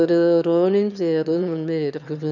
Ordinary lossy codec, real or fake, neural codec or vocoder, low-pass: none; fake; autoencoder, 22.05 kHz, a latent of 192 numbers a frame, VITS, trained on one speaker; 7.2 kHz